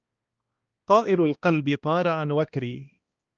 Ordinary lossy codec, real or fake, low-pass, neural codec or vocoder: Opus, 24 kbps; fake; 7.2 kHz; codec, 16 kHz, 1 kbps, X-Codec, HuBERT features, trained on balanced general audio